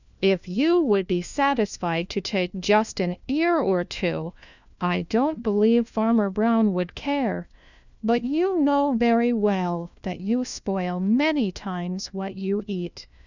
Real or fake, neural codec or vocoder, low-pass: fake; codec, 16 kHz, 1 kbps, FunCodec, trained on LibriTTS, 50 frames a second; 7.2 kHz